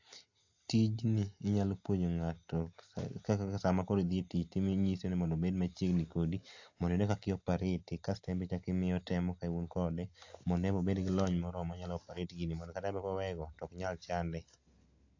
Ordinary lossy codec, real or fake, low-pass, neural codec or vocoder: AAC, 48 kbps; real; 7.2 kHz; none